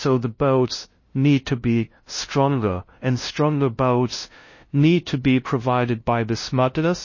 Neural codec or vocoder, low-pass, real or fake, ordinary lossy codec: codec, 16 kHz, 0.5 kbps, FunCodec, trained on LibriTTS, 25 frames a second; 7.2 kHz; fake; MP3, 32 kbps